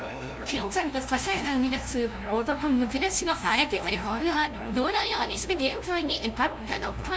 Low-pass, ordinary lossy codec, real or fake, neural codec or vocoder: none; none; fake; codec, 16 kHz, 0.5 kbps, FunCodec, trained on LibriTTS, 25 frames a second